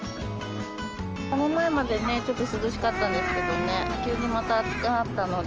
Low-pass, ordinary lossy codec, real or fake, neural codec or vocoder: 7.2 kHz; Opus, 24 kbps; real; none